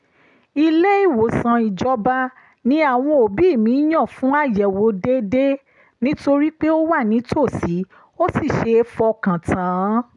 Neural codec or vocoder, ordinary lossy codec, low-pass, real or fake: none; none; 10.8 kHz; real